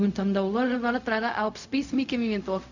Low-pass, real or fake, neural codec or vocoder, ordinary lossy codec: 7.2 kHz; fake; codec, 16 kHz, 0.4 kbps, LongCat-Audio-Codec; none